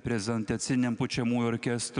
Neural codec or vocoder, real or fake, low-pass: none; real; 9.9 kHz